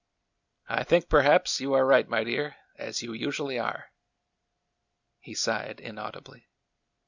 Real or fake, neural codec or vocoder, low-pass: real; none; 7.2 kHz